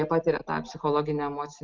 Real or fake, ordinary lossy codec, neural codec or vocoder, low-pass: real; Opus, 32 kbps; none; 7.2 kHz